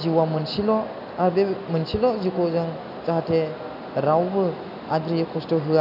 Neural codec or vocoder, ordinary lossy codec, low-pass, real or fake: none; none; 5.4 kHz; real